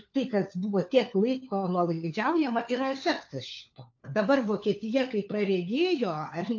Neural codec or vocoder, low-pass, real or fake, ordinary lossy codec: codec, 16 kHz, 4 kbps, FunCodec, trained on LibriTTS, 50 frames a second; 7.2 kHz; fake; AAC, 48 kbps